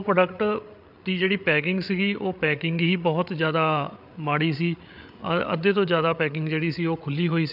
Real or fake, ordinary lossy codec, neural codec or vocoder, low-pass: fake; none; codec, 16 kHz, 8 kbps, FreqCodec, larger model; 5.4 kHz